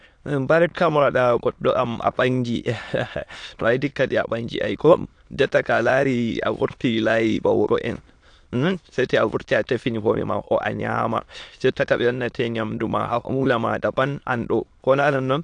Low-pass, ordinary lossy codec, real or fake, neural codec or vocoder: 9.9 kHz; AAC, 64 kbps; fake; autoencoder, 22.05 kHz, a latent of 192 numbers a frame, VITS, trained on many speakers